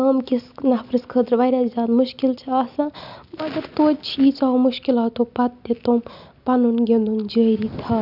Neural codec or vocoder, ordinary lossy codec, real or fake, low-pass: none; none; real; 5.4 kHz